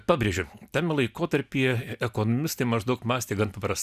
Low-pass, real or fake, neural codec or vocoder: 14.4 kHz; real; none